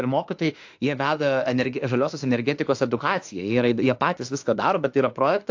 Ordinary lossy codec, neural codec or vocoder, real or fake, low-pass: AAC, 48 kbps; autoencoder, 48 kHz, 32 numbers a frame, DAC-VAE, trained on Japanese speech; fake; 7.2 kHz